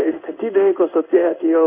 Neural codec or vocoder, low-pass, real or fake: codec, 16 kHz in and 24 kHz out, 1 kbps, XY-Tokenizer; 3.6 kHz; fake